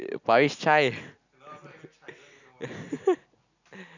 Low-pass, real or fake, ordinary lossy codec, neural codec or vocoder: 7.2 kHz; real; none; none